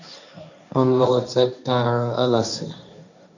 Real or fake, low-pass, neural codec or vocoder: fake; 7.2 kHz; codec, 16 kHz, 1.1 kbps, Voila-Tokenizer